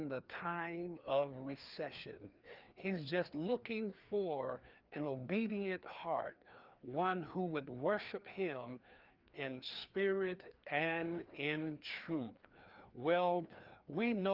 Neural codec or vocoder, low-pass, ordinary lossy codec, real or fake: codec, 16 kHz, 2 kbps, FreqCodec, larger model; 5.4 kHz; Opus, 32 kbps; fake